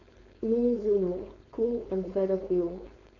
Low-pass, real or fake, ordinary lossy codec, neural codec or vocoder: 7.2 kHz; fake; none; codec, 16 kHz, 4.8 kbps, FACodec